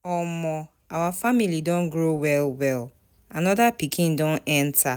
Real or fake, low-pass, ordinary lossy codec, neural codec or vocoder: real; none; none; none